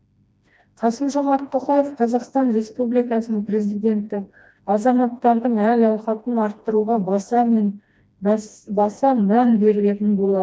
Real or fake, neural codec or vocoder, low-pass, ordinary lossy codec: fake; codec, 16 kHz, 1 kbps, FreqCodec, smaller model; none; none